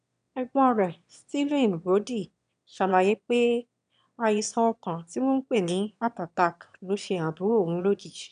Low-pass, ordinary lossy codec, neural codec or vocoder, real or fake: 9.9 kHz; none; autoencoder, 22.05 kHz, a latent of 192 numbers a frame, VITS, trained on one speaker; fake